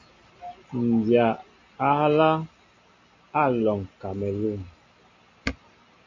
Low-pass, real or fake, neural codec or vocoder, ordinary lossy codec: 7.2 kHz; real; none; MP3, 48 kbps